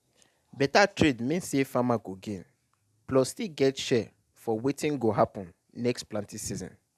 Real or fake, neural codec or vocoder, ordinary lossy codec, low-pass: real; none; none; 14.4 kHz